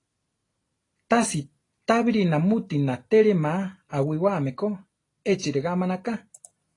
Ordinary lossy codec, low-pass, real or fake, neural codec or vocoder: AAC, 32 kbps; 10.8 kHz; real; none